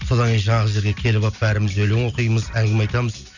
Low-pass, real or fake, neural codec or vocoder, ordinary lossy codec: 7.2 kHz; real; none; none